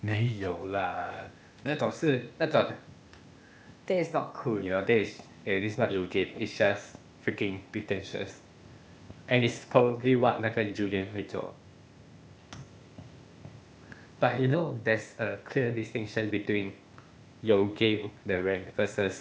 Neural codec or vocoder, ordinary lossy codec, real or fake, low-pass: codec, 16 kHz, 0.8 kbps, ZipCodec; none; fake; none